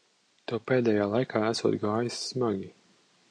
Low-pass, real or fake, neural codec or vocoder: 9.9 kHz; real; none